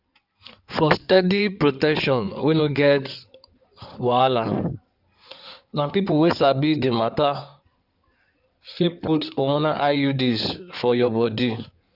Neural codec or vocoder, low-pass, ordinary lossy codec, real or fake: codec, 16 kHz in and 24 kHz out, 2.2 kbps, FireRedTTS-2 codec; 5.4 kHz; none; fake